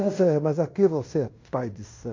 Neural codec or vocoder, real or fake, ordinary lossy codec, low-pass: codec, 16 kHz in and 24 kHz out, 0.9 kbps, LongCat-Audio-Codec, fine tuned four codebook decoder; fake; MP3, 48 kbps; 7.2 kHz